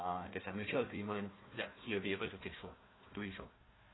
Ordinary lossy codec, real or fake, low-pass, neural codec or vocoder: AAC, 16 kbps; fake; 7.2 kHz; codec, 16 kHz, 1 kbps, FunCodec, trained on Chinese and English, 50 frames a second